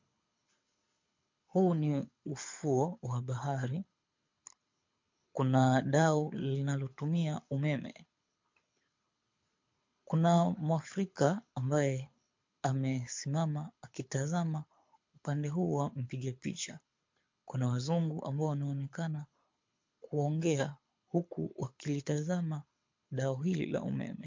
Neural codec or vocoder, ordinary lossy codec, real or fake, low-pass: codec, 24 kHz, 6 kbps, HILCodec; MP3, 48 kbps; fake; 7.2 kHz